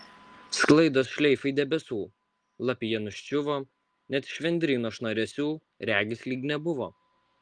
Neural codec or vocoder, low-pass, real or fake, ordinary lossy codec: none; 9.9 kHz; real; Opus, 24 kbps